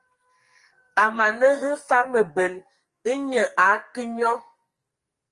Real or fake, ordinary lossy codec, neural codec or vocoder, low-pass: fake; Opus, 24 kbps; codec, 44.1 kHz, 2.6 kbps, SNAC; 10.8 kHz